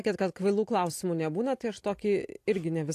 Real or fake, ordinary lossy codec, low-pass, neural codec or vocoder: real; AAC, 64 kbps; 14.4 kHz; none